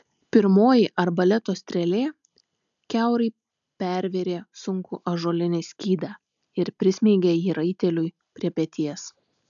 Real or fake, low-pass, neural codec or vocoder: real; 7.2 kHz; none